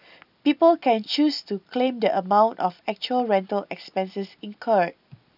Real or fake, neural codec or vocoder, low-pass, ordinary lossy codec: real; none; 5.4 kHz; none